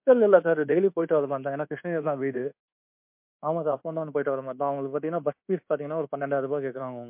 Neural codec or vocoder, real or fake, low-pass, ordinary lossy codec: codec, 24 kHz, 1.2 kbps, DualCodec; fake; 3.6 kHz; MP3, 32 kbps